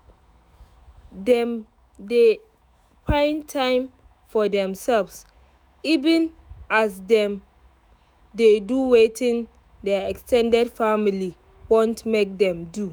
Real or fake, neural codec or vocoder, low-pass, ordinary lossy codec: fake; autoencoder, 48 kHz, 128 numbers a frame, DAC-VAE, trained on Japanese speech; none; none